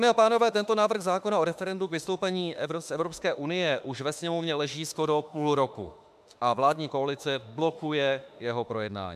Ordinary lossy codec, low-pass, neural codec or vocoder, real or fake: MP3, 96 kbps; 14.4 kHz; autoencoder, 48 kHz, 32 numbers a frame, DAC-VAE, trained on Japanese speech; fake